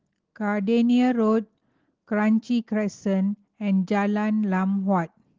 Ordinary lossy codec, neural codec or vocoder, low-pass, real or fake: Opus, 16 kbps; none; 7.2 kHz; real